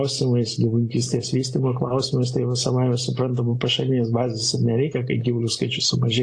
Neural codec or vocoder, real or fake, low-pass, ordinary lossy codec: none; real; 9.9 kHz; AAC, 48 kbps